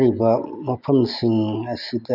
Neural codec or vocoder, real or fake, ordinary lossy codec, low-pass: none; real; none; 5.4 kHz